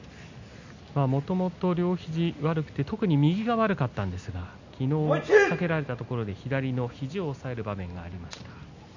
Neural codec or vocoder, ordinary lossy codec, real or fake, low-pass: none; none; real; 7.2 kHz